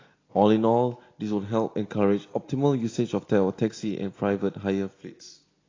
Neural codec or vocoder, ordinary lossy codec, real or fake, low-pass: none; AAC, 32 kbps; real; 7.2 kHz